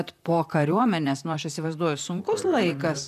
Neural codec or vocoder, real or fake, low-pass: vocoder, 44.1 kHz, 128 mel bands, Pupu-Vocoder; fake; 14.4 kHz